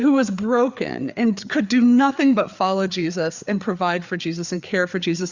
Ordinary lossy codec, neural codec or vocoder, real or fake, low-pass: Opus, 64 kbps; codec, 16 kHz, 4 kbps, FreqCodec, larger model; fake; 7.2 kHz